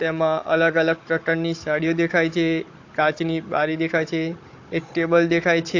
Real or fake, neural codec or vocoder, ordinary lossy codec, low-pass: fake; codec, 16 kHz, 16 kbps, FunCodec, trained on Chinese and English, 50 frames a second; AAC, 48 kbps; 7.2 kHz